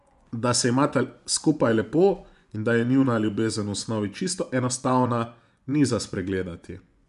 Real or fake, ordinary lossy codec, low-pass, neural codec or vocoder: fake; none; 10.8 kHz; vocoder, 24 kHz, 100 mel bands, Vocos